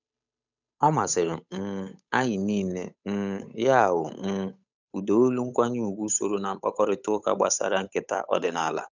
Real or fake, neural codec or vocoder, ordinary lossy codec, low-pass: fake; codec, 16 kHz, 8 kbps, FunCodec, trained on Chinese and English, 25 frames a second; none; 7.2 kHz